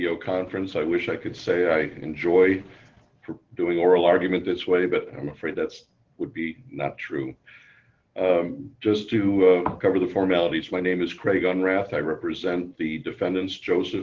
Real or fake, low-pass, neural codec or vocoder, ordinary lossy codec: real; 7.2 kHz; none; Opus, 16 kbps